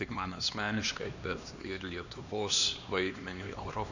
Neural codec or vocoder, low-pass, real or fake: codec, 16 kHz, 2 kbps, X-Codec, HuBERT features, trained on LibriSpeech; 7.2 kHz; fake